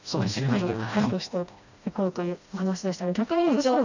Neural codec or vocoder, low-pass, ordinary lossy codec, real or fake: codec, 16 kHz, 1 kbps, FreqCodec, smaller model; 7.2 kHz; none; fake